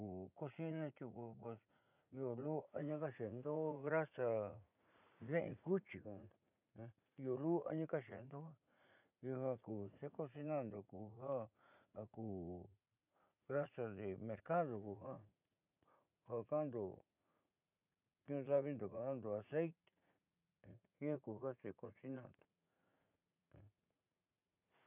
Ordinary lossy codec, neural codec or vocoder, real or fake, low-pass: none; vocoder, 44.1 kHz, 80 mel bands, Vocos; fake; 3.6 kHz